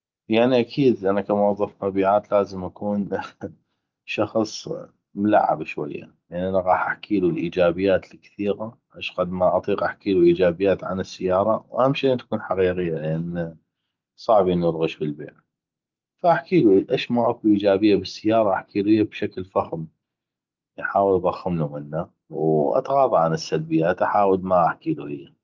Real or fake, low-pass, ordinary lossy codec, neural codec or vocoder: real; 7.2 kHz; Opus, 32 kbps; none